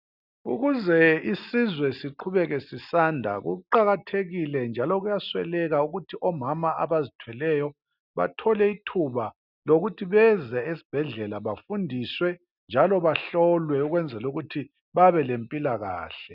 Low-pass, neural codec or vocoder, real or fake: 5.4 kHz; none; real